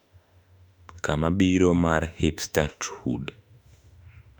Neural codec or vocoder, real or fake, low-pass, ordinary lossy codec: autoencoder, 48 kHz, 32 numbers a frame, DAC-VAE, trained on Japanese speech; fake; 19.8 kHz; none